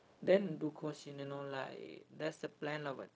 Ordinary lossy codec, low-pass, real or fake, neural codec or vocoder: none; none; fake; codec, 16 kHz, 0.4 kbps, LongCat-Audio-Codec